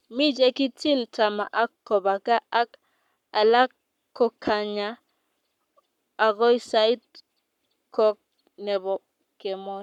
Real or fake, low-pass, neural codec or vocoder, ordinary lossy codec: fake; 19.8 kHz; codec, 44.1 kHz, 7.8 kbps, Pupu-Codec; none